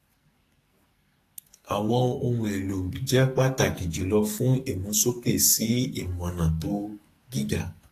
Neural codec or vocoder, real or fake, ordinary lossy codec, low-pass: codec, 44.1 kHz, 2.6 kbps, SNAC; fake; AAC, 48 kbps; 14.4 kHz